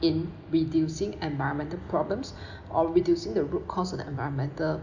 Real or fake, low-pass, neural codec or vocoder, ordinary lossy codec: real; 7.2 kHz; none; none